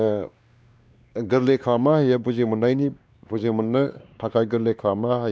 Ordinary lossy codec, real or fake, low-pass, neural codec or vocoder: none; fake; none; codec, 16 kHz, 2 kbps, X-Codec, WavLM features, trained on Multilingual LibriSpeech